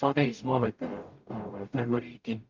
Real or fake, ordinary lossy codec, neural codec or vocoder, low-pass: fake; Opus, 32 kbps; codec, 44.1 kHz, 0.9 kbps, DAC; 7.2 kHz